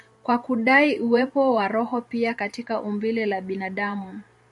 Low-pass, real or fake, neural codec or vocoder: 10.8 kHz; fake; vocoder, 44.1 kHz, 128 mel bands every 512 samples, BigVGAN v2